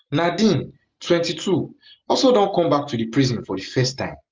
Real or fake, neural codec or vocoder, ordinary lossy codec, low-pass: real; none; Opus, 32 kbps; 7.2 kHz